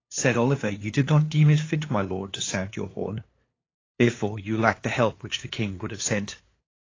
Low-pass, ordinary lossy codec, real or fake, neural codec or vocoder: 7.2 kHz; AAC, 32 kbps; fake; codec, 16 kHz, 2 kbps, FunCodec, trained on LibriTTS, 25 frames a second